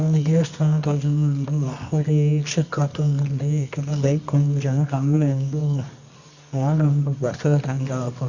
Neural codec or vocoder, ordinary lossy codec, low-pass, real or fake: codec, 24 kHz, 0.9 kbps, WavTokenizer, medium music audio release; Opus, 64 kbps; 7.2 kHz; fake